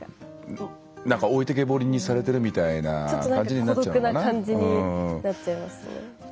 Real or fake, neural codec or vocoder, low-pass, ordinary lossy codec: real; none; none; none